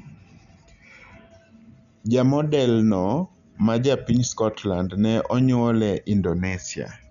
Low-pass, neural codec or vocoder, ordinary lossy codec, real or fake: 7.2 kHz; none; none; real